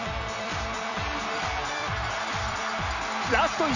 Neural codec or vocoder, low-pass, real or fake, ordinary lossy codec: vocoder, 44.1 kHz, 128 mel bands every 256 samples, BigVGAN v2; 7.2 kHz; fake; none